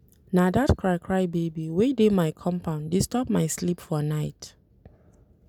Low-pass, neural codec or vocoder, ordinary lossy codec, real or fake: 19.8 kHz; none; none; real